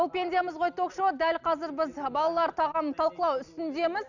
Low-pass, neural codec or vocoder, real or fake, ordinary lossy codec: 7.2 kHz; none; real; none